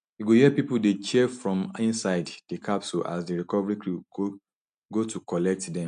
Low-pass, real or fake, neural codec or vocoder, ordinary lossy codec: 9.9 kHz; real; none; none